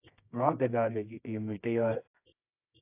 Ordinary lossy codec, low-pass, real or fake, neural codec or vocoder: AAC, 32 kbps; 3.6 kHz; fake; codec, 24 kHz, 0.9 kbps, WavTokenizer, medium music audio release